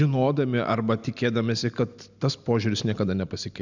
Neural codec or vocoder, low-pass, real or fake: none; 7.2 kHz; real